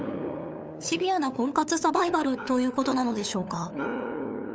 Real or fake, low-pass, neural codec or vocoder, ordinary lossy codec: fake; none; codec, 16 kHz, 8 kbps, FunCodec, trained on LibriTTS, 25 frames a second; none